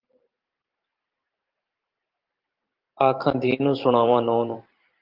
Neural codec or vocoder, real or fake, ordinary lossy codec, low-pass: vocoder, 44.1 kHz, 128 mel bands every 512 samples, BigVGAN v2; fake; Opus, 32 kbps; 5.4 kHz